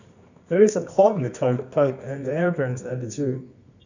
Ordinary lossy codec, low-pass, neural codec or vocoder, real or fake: none; 7.2 kHz; codec, 24 kHz, 0.9 kbps, WavTokenizer, medium music audio release; fake